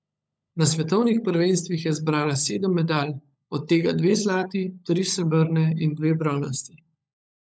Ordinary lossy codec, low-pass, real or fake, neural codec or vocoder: none; none; fake; codec, 16 kHz, 16 kbps, FunCodec, trained on LibriTTS, 50 frames a second